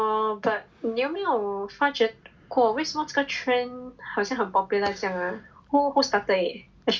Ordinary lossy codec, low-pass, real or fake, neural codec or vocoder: none; none; real; none